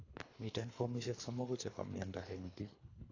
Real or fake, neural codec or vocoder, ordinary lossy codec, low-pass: fake; codec, 24 kHz, 3 kbps, HILCodec; AAC, 32 kbps; 7.2 kHz